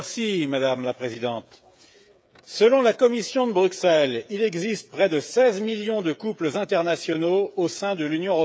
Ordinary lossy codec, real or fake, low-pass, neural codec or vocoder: none; fake; none; codec, 16 kHz, 8 kbps, FreqCodec, smaller model